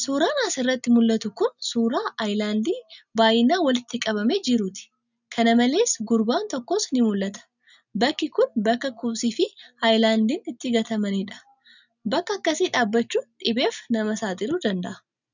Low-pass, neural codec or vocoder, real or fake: 7.2 kHz; none; real